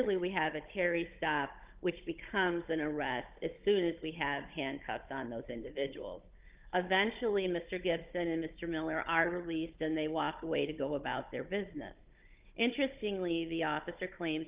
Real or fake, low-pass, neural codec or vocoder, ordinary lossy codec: fake; 3.6 kHz; codec, 16 kHz, 16 kbps, FunCodec, trained on Chinese and English, 50 frames a second; Opus, 32 kbps